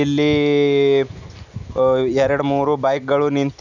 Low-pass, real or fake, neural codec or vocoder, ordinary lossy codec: 7.2 kHz; real; none; none